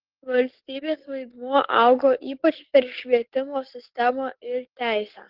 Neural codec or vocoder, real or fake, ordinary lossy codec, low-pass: codec, 44.1 kHz, 7.8 kbps, DAC; fake; Opus, 16 kbps; 5.4 kHz